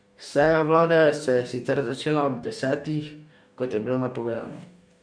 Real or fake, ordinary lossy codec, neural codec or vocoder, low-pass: fake; none; codec, 44.1 kHz, 2.6 kbps, DAC; 9.9 kHz